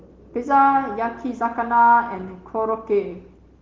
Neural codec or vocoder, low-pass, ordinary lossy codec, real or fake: none; 7.2 kHz; Opus, 16 kbps; real